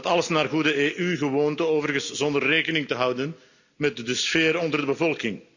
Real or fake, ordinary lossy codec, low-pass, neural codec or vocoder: real; none; 7.2 kHz; none